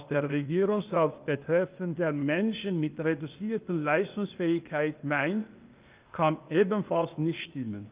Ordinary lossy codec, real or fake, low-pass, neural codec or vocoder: Opus, 24 kbps; fake; 3.6 kHz; codec, 16 kHz, 0.8 kbps, ZipCodec